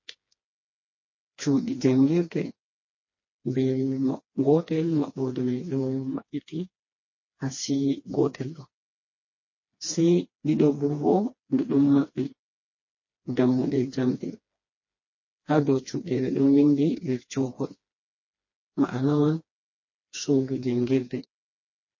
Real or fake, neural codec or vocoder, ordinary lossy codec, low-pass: fake; codec, 16 kHz, 2 kbps, FreqCodec, smaller model; MP3, 32 kbps; 7.2 kHz